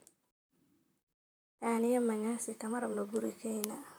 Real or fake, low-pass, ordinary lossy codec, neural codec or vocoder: fake; none; none; vocoder, 44.1 kHz, 128 mel bands, Pupu-Vocoder